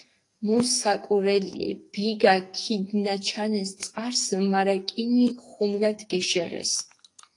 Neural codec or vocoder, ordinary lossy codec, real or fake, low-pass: codec, 44.1 kHz, 2.6 kbps, SNAC; AAC, 48 kbps; fake; 10.8 kHz